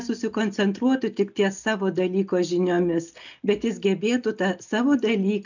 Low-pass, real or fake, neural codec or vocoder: 7.2 kHz; real; none